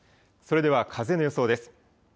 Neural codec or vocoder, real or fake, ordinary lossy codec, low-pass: none; real; none; none